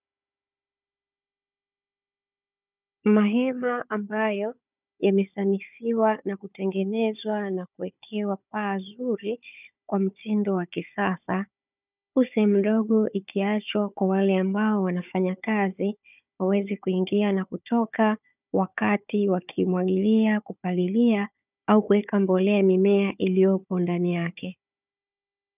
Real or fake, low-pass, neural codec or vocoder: fake; 3.6 kHz; codec, 16 kHz, 4 kbps, FunCodec, trained on Chinese and English, 50 frames a second